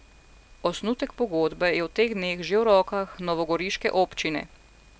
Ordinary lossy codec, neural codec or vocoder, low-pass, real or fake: none; none; none; real